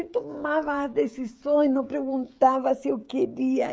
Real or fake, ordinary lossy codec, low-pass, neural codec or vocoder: fake; none; none; codec, 16 kHz, 16 kbps, FreqCodec, smaller model